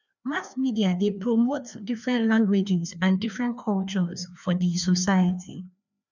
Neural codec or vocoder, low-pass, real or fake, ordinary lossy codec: codec, 16 kHz, 2 kbps, FreqCodec, larger model; 7.2 kHz; fake; none